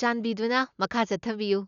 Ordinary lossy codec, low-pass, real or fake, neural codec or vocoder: none; 7.2 kHz; real; none